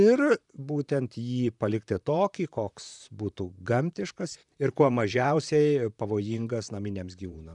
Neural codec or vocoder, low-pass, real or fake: vocoder, 44.1 kHz, 128 mel bands, Pupu-Vocoder; 10.8 kHz; fake